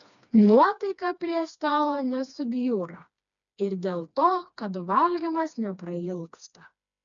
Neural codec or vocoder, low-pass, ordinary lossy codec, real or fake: codec, 16 kHz, 2 kbps, FreqCodec, smaller model; 7.2 kHz; MP3, 96 kbps; fake